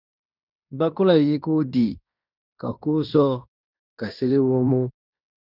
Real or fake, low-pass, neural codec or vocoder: fake; 5.4 kHz; codec, 16 kHz in and 24 kHz out, 0.9 kbps, LongCat-Audio-Codec, fine tuned four codebook decoder